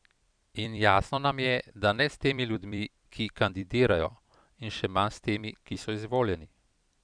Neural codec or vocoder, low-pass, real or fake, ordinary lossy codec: vocoder, 44.1 kHz, 128 mel bands every 256 samples, BigVGAN v2; 9.9 kHz; fake; none